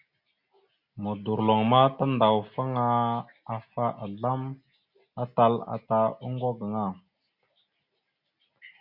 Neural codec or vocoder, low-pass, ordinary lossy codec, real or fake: none; 5.4 kHz; Opus, 64 kbps; real